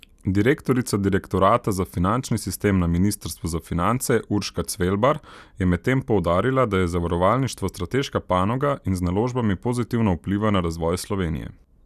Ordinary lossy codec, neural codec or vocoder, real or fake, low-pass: none; none; real; 14.4 kHz